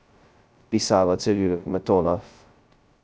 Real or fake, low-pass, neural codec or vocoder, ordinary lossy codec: fake; none; codec, 16 kHz, 0.2 kbps, FocalCodec; none